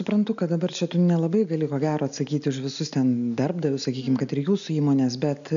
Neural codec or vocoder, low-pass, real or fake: none; 7.2 kHz; real